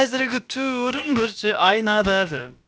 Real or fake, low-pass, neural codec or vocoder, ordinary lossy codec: fake; none; codec, 16 kHz, about 1 kbps, DyCAST, with the encoder's durations; none